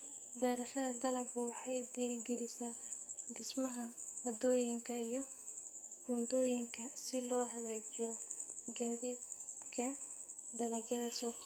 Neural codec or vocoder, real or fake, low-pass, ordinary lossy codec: codec, 44.1 kHz, 2.6 kbps, SNAC; fake; none; none